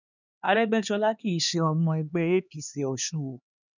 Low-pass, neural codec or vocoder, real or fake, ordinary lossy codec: 7.2 kHz; codec, 16 kHz, 2 kbps, X-Codec, HuBERT features, trained on LibriSpeech; fake; none